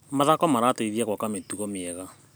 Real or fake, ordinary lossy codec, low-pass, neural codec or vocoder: real; none; none; none